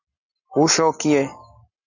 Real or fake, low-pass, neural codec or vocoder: real; 7.2 kHz; none